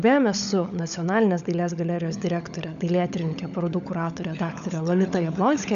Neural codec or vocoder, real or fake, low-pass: codec, 16 kHz, 16 kbps, FunCodec, trained on LibriTTS, 50 frames a second; fake; 7.2 kHz